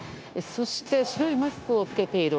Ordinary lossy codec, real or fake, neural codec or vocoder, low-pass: none; fake; codec, 16 kHz, 0.9 kbps, LongCat-Audio-Codec; none